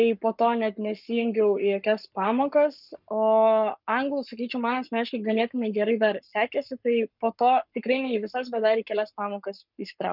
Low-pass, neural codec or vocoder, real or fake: 5.4 kHz; codec, 44.1 kHz, 7.8 kbps, Pupu-Codec; fake